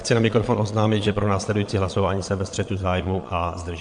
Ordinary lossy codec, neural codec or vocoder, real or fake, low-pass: MP3, 64 kbps; vocoder, 22.05 kHz, 80 mel bands, Vocos; fake; 9.9 kHz